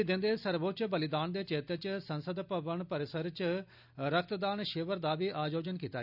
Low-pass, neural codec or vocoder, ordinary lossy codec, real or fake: 5.4 kHz; none; none; real